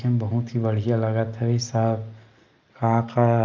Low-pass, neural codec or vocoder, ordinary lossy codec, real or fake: 7.2 kHz; none; Opus, 24 kbps; real